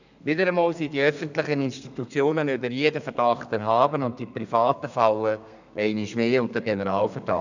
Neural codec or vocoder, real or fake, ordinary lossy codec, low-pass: codec, 32 kHz, 1.9 kbps, SNAC; fake; none; 7.2 kHz